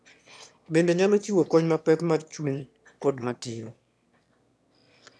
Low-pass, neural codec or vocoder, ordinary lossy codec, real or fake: none; autoencoder, 22.05 kHz, a latent of 192 numbers a frame, VITS, trained on one speaker; none; fake